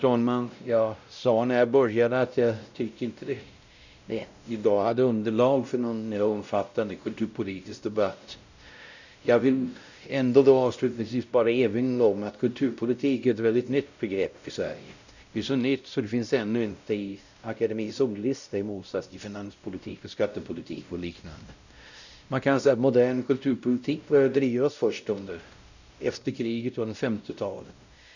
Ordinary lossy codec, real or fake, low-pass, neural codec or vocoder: none; fake; 7.2 kHz; codec, 16 kHz, 0.5 kbps, X-Codec, WavLM features, trained on Multilingual LibriSpeech